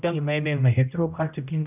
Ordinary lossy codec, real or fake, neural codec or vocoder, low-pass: none; fake; codec, 16 kHz, 0.5 kbps, X-Codec, HuBERT features, trained on general audio; 3.6 kHz